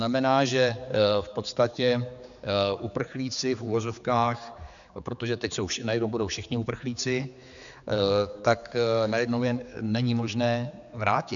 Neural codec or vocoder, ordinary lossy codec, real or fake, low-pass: codec, 16 kHz, 4 kbps, X-Codec, HuBERT features, trained on general audio; MP3, 96 kbps; fake; 7.2 kHz